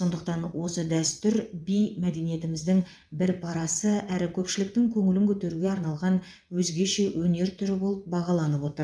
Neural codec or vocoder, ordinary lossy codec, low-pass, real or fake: vocoder, 22.05 kHz, 80 mel bands, WaveNeXt; none; none; fake